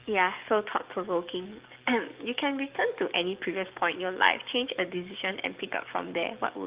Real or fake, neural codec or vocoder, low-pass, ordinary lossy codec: fake; codec, 44.1 kHz, 7.8 kbps, DAC; 3.6 kHz; Opus, 32 kbps